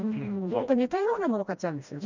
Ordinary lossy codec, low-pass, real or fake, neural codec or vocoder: none; 7.2 kHz; fake; codec, 16 kHz, 1 kbps, FreqCodec, smaller model